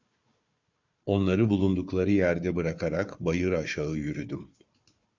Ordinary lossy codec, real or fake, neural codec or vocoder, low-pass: Opus, 64 kbps; fake; codec, 16 kHz, 4 kbps, FunCodec, trained on Chinese and English, 50 frames a second; 7.2 kHz